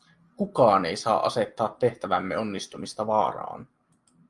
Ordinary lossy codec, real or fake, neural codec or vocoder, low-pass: Opus, 32 kbps; real; none; 10.8 kHz